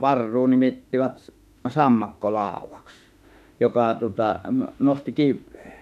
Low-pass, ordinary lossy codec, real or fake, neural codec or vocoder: 14.4 kHz; none; fake; autoencoder, 48 kHz, 32 numbers a frame, DAC-VAE, trained on Japanese speech